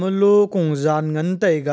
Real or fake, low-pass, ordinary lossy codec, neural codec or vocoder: real; none; none; none